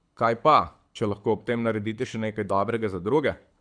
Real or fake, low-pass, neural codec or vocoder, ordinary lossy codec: fake; 9.9 kHz; codec, 24 kHz, 6 kbps, HILCodec; MP3, 96 kbps